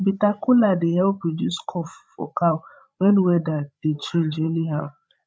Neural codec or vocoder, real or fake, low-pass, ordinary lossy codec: codec, 16 kHz, 16 kbps, FreqCodec, larger model; fake; none; none